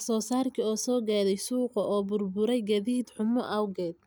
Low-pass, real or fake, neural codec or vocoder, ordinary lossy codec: none; real; none; none